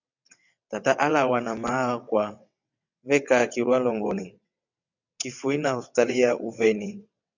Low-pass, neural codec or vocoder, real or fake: 7.2 kHz; vocoder, 22.05 kHz, 80 mel bands, WaveNeXt; fake